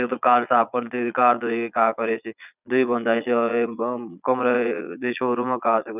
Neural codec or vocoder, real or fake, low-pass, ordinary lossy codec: vocoder, 22.05 kHz, 80 mel bands, Vocos; fake; 3.6 kHz; none